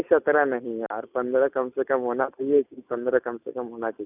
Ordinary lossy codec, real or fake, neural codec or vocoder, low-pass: none; real; none; 3.6 kHz